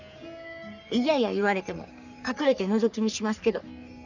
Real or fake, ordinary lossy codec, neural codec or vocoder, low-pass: fake; none; codec, 44.1 kHz, 3.4 kbps, Pupu-Codec; 7.2 kHz